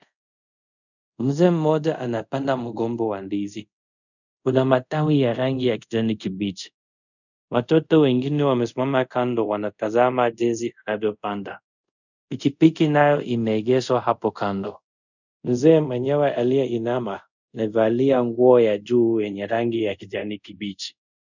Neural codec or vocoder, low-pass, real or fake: codec, 24 kHz, 0.5 kbps, DualCodec; 7.2 kHz; fake